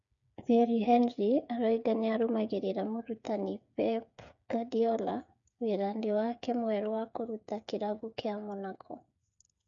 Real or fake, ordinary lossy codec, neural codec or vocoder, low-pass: fake; none; codec, 16 kHz, 8 kbps, FreqCodec, smaller model; 7.2 kHz